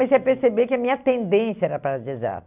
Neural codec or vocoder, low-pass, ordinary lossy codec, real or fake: none; 3.6 kHz; none; real